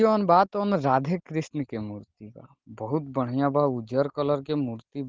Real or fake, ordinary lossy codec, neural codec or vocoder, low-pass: real; Opus, 16 kbps; none; 7.2 kHz